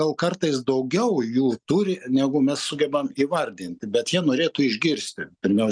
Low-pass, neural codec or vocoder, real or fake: 9.9 kHz; none; real